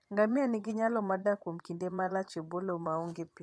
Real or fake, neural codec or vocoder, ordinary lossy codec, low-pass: fake; vocoder, 22.05 kHz, 80 mel bands, Vocos; none; none